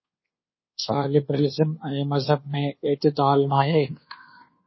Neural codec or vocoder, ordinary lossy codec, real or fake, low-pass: codec, 24 kHz, 1.2 kbps, DualCodec; MP3, 24 kbps; fake; 7.2 kHz